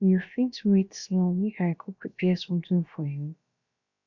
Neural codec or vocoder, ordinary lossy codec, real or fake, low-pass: codec, 16 kHz, about 1 kbps, DyCAST, with the encoder's durations; none; fake; 7.2 kHz